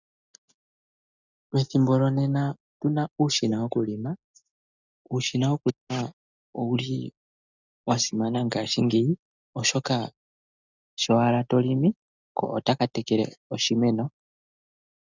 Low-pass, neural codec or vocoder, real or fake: 7.2 kHz; none; real